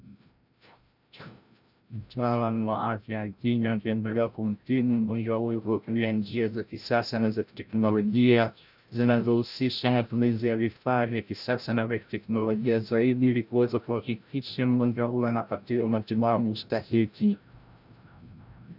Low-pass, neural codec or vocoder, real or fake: 5.4 kHz; codec, 16 kHz, 0.5 kbps, FreqCodec, larger model; fake